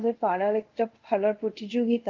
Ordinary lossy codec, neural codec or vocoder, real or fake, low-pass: Opus, 32 kbps; codec, 24 kHz, 0.5 kbps, DualCodec; fake; 7.2 kHz